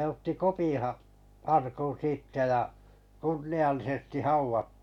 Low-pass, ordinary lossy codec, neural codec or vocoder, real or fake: 19.8 kHz; none; none; real